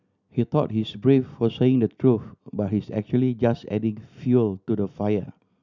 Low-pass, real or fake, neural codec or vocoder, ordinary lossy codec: 7.2 kHz; real; none; none